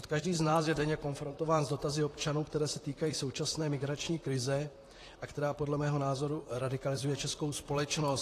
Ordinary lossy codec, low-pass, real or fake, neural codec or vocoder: AAC, 48 kbps; 14.4 kHz; fake; vocoder, 44.1 kHz, 128 mel bands, Pupu-Vocoder